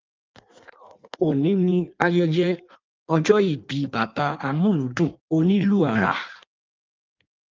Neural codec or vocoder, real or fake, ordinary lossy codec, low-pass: codec, 16 kHz in and 24 kHz out, 1.1 kbps, FireRedTTS-2 codec; fake; Opus, 32 kbps; 7.2 kHz